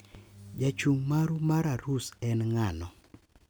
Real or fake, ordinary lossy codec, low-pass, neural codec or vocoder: real; none; none; none